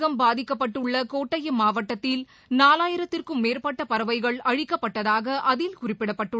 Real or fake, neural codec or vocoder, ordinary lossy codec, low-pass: real; none; none; none